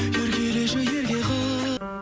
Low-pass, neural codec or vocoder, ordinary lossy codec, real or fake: none; none; none; real